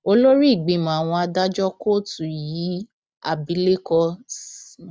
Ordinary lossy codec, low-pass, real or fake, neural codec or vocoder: none; none; real; none